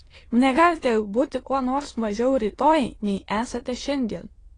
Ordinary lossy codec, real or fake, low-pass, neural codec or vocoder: AAC, 32 kbps; fake; 9.9 kHz; autoencoder, 22.05 kHz, a latent of 192 numbers a frame, VITS, trained on many speakers